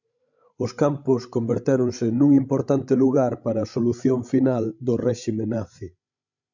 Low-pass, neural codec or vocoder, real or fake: 7.2 kHz; codec, 16 kHz, 16 kbps, FreqCodec, larger model; fake